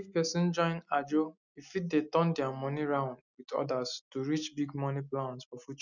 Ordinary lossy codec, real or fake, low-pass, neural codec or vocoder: none; real; 7.2 kHz; none